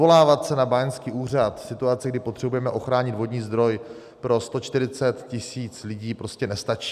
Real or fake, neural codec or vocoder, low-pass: real; none; 14.4 kHz